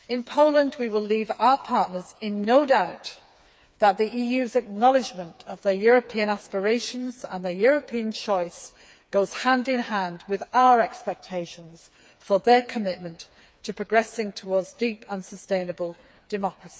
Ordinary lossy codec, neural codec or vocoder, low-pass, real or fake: none; codec, 16 kHz, 4 kbps, FreqCodec, smaller model; none; fake